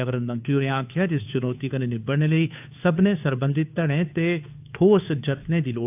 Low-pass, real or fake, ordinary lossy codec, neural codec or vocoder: 3.6 kHz; fake; AAC, 32 kbps; codec, 16 kHz, 2 kbps, FunCodec, trained on Chinese and English, 25 frames a second